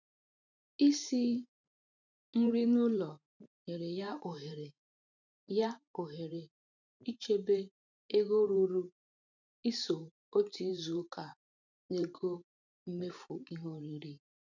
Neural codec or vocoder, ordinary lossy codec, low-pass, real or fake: vocoder, 44.1 kHz, 128 mel bands every 512 samples, BigVGAN v2; none; 7.2 kHz; fake